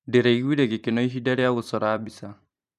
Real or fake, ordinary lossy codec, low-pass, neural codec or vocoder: fake; none; 14.4 kHz; vocoder, 44.1 kHz, 128 mel bands every 512 samples, BigVGAN v2